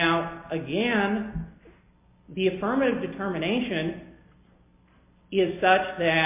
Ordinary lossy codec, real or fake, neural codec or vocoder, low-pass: MP3, 32 kbps; real; none; 3.6 kHz